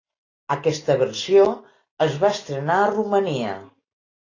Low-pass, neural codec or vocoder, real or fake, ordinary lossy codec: 7.2 kHz; none; real; AAC, 32 kbps